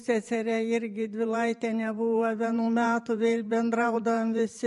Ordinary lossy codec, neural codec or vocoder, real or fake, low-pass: MP3, 48 kbps; vocoder, 44.1 kHz, 128 mel bands every 512 samples, BigVGAN v2; fake; 14.4 kHz